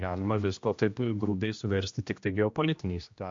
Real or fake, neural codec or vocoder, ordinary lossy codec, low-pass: fake; codec, 16 kHz, 1 kbps, X-Codec, HuBERT features, trained on general audio; MP3, 48 kbps; 7.2 kHz